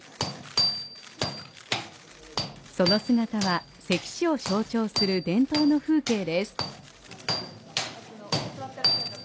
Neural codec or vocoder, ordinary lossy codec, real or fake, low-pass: none; none; real; none